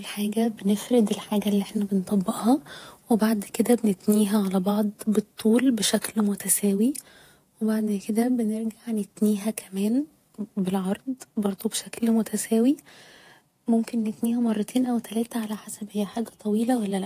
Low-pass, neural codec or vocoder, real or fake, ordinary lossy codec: 14.4 kHz; vocoder, 48 kHz, 128 mel bands, Vocos; fake; AAC, 64 kbps